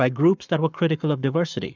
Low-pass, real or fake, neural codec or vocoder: 7.2 kHz; fake; codec, 44.1 kHz, 7.8 kbps, Pupu-Codec